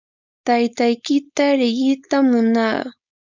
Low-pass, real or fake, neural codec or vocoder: 7.2 kHz; fake; codec, 16 kHz, 4.8 kbps, FACodec